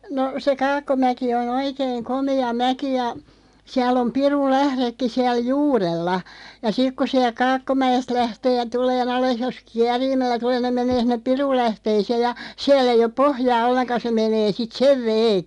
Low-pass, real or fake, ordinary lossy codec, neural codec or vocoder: 10.8 kHz; real; MP3, 96 kbps; none